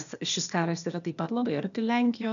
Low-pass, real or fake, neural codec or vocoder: 7.2 kHz; fake; codec, 16 kHz, 0.8 kbps, ZipCodec